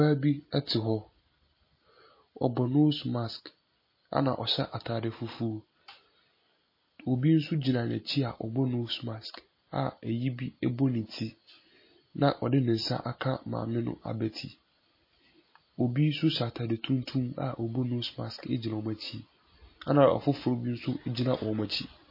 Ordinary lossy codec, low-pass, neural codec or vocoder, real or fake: MP3, 24 kbps; 5.4 kHz; none; real